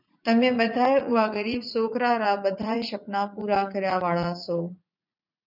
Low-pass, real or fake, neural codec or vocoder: 5.4 kHz; fake; vocoder, 44.1 kHz, 80 mel bands, Vocos